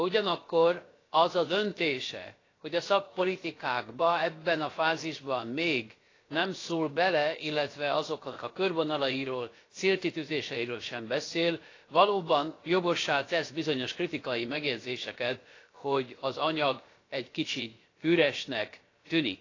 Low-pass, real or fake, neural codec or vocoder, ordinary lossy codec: 7.2 kHz; fake; codec, 16 kHz, about 1 kbps, DyCAST, with the encoder's durations; AAC, 32 kbps